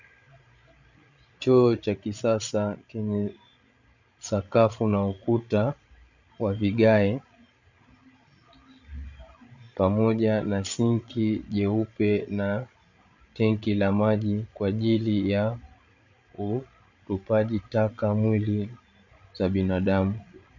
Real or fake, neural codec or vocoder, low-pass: fake; codec, 16 kHz, 16 kbps, FreqCodec, larger model; 7.2 kHz